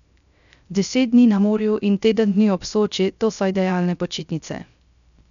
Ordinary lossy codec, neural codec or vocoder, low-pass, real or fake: none; codec, 16 kHz, 0.3 kbps, FocalCodec; 7.2 kHz; fake